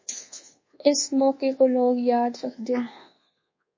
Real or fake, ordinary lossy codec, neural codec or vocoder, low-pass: fake; MP3, 32 kbps; codec, 24 kHz, 1.2 kbps, DualCodec; 7.2 kHz